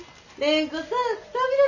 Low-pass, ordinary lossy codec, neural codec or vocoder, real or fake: 7.2 kHz; none; none; real